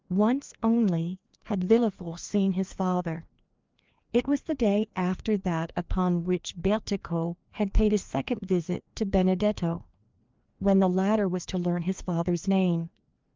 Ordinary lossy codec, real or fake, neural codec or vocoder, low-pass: Opus, 32 kbps; fake; codec, 16 kHz, 2 kbps, FreqCodec, larger model; 7.2 kHz